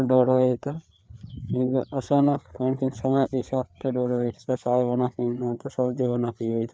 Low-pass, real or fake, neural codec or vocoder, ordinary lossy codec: none; fake; codec, 16 kHz, 4 kbps, FreqCodec, larger model; none